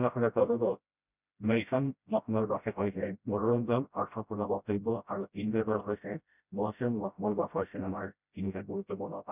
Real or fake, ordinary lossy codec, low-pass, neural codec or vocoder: fake; none; 3.6 kHz; codec, 16 kHz, 0.5 kbps, FreqCodec, smaller model